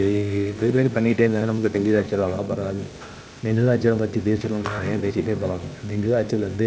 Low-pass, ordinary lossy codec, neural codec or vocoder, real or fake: none; none; codec, 16 kHz, 0.8 kbps, ZipCodec; fake